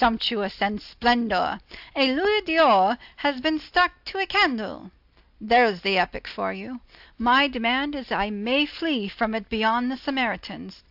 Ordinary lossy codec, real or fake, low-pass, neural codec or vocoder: AAC, 48 kbps; real; 5.4 kHz; none